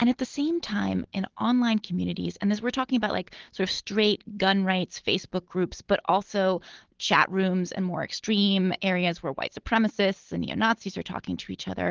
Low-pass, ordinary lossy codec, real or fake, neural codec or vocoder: 7.2 kHz; Opus, 16 kbps; real; none